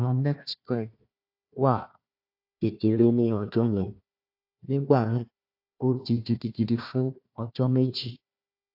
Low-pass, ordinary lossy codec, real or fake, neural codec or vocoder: 5.4 kHz; AAC, 48 kbps; fake; codec, 16 kHz, 1 kbps, FunCodec, trained on Chinese and English, 50 frames a second